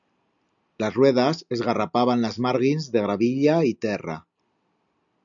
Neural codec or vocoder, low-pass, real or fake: none; 7.2 kHz; real